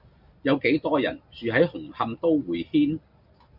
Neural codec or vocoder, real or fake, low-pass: none; real; 5.4 kHz